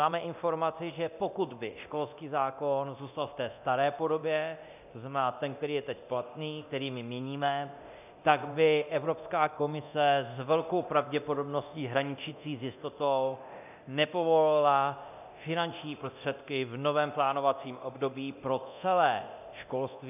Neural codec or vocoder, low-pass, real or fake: codec, 24 kHz, 0.9 kbps, DualCodec; 3.6 kHz; fake